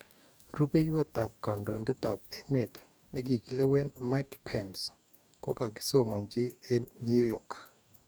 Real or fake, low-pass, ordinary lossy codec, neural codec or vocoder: fake; none; none; codec, 44.1 kHz, 2.6 kbps, DAC